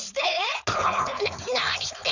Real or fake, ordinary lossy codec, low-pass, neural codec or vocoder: fake; none; 7.2 kHz; codec, 16 kHz, 4.8 kbps, FACodec